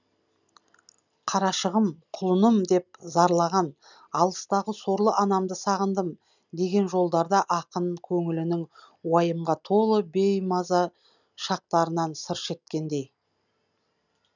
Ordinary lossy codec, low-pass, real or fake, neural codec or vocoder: none; 7.2 kHz; real; none